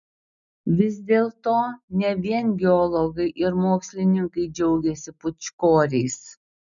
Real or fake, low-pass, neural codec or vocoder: real; 7.2 kHz; none